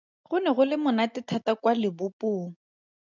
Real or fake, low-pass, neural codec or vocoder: real; 7.2 kHz; none